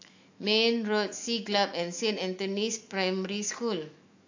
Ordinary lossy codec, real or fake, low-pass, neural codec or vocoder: AAC, 48 kbps; fake; 7.2 kHz; autoencoder, 48 kHz, 128 numbers a frame, DAC-VAE, trained on Japanese speech